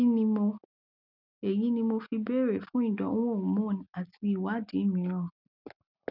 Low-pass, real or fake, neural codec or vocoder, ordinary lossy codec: 5.4 kHz; real; none; none